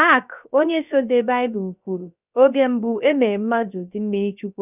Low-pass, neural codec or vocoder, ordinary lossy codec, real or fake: 3.6 kHz; codec, 16 kHz, 0.3 kbps, FocalCodec; none; fake